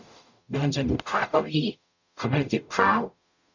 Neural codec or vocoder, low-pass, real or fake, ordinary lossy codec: codec, 44.1 kHz, 0.9 kbps, DAC; 7.2 kHz; fake; none